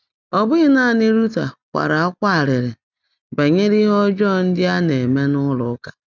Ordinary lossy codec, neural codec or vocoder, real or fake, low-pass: none; none; real; 7.2 kHz